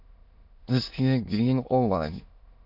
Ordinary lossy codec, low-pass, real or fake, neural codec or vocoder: AAC, 48 kbps; 5.4 kHz; fake; autoencoder, 22.05 kHz, a latent of 192 numbers a frame, VITS, trained on many speakers